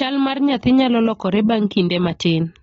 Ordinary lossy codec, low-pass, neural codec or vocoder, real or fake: AAC, 24 kbps; 19.8 kHz; none; real